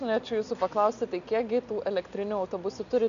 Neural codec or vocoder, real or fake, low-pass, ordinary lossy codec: none; real; 7.2 kHz; MP3, 96 kbps